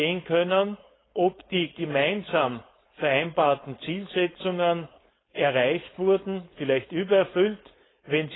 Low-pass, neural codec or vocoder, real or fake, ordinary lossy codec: 7.2 kHz; codec, 16 kHz, 4.8 kbps, FACodec; fake; AAC, 16 kbps